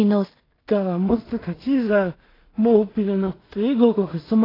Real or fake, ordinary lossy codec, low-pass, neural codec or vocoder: fake; AAC, 24 kbps; 5.4 kHz; codec, 16 kHz in and 24 kHz out, 0.4 kbps, LongCat-Audio-Codec, two codebook decoder